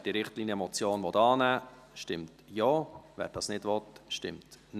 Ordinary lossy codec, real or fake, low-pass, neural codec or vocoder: none; real; 14.4 kHz; none